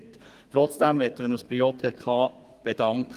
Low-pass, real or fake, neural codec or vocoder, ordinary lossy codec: 14.4 kHz; fake; codec, 32 kHz, 1.9 kbps, SNAC; Opus, 32 kbps